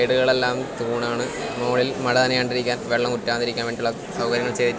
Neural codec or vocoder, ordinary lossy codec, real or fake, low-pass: none; none; real; none